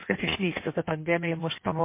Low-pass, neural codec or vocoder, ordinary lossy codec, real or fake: 3.6 kHz; codec, 16 kHz in and 24 kHz out, 1.1 kbps, FireRedTTS-2 codec; MP3, 24 kbps; fake